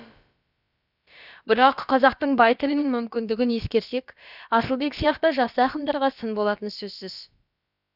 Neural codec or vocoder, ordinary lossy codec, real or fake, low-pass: codec, 16 kHz, about 1 kbps, DyCAST, with the encoder's durations; none; fake; 5.4 kHz